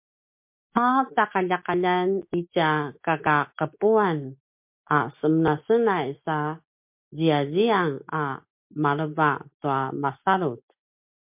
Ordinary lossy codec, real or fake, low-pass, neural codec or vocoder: MP3, 32 kbps; real; 3.6 kHz; none